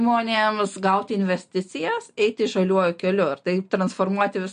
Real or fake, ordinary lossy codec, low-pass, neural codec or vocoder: real; MP3, 48 kbps; 9.9 kHz; none